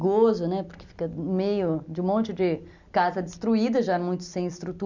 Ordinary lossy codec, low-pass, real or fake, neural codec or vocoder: none; 7.2 kHz; real; none